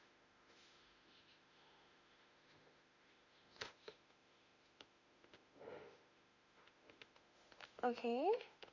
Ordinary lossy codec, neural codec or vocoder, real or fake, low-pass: none; autoencoder, 48 kHz, 32 numbers a frame, DAC-VAE, trained on Japanese speech; fake; 7.2 kHz